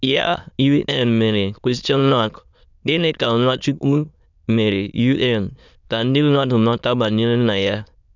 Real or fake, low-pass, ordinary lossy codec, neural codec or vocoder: fake; 7.2 kHz; none; autoencoder, 22.05 kHz, a latent of 192 numbers a frame, VITS, trained on many speakers